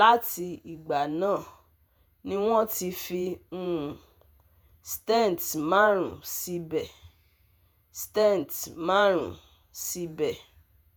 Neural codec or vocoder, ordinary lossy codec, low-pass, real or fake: vocoder, 48 kHz, 128 mel bands, Vocos; none; none; fake